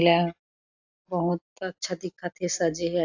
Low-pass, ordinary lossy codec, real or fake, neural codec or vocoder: 7.2 kHz; AAC, 48 kbps; real; none